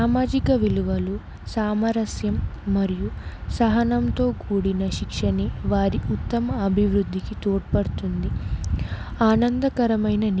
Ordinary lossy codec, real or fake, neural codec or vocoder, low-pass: none; real; none; none